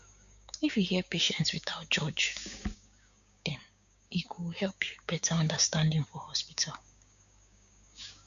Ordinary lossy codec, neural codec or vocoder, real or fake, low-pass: none; codec, 16 kHz, 6 kbps, DAC; fake; 7.2 kHz